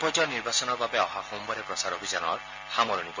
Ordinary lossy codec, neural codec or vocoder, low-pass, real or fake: MP3, 32 kbps; none; 7.2 kHz; real